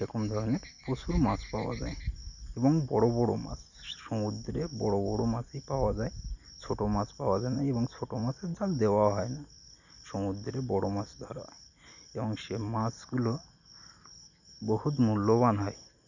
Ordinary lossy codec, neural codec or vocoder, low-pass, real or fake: none; none; 7.2 kHz; real